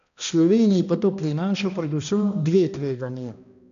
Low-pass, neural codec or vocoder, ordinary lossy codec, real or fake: 7.2 kHz; codec, 16 kHz, 1 kbps, X-Codec, HuBERT features, trained on balanced general audio; none; fake